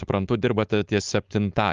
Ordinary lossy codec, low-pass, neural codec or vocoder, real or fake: Opus, 32 kbps; 7.2 kHz; codec, 16 kHz, 4.8 kbps, FACodec; fake